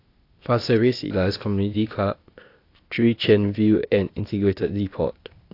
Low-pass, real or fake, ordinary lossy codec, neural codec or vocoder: 5.4 kHz; fake; AAC, 32 kbps; codec, 16 kHz, 0.8 kbps, ZipCodec